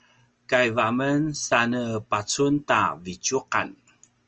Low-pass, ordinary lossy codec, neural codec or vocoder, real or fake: 7.2 kHz; Opus, 24 kbps; none; real